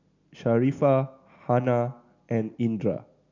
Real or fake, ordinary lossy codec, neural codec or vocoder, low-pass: real; none; none; 7.2 kHz